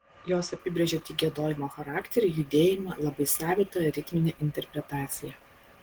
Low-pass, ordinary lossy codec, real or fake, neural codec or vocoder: 19.8 kHz; Opus, 16 kbps; real; none